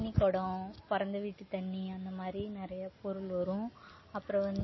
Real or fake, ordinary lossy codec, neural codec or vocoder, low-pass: real; MP3, 24 kbps; none; 7.2 kHz